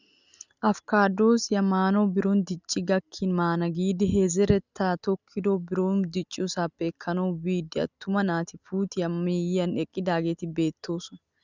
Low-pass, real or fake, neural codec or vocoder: 7.2 kHz; real; none